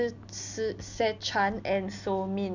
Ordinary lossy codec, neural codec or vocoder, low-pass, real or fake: none; none; 7.2 kHz; real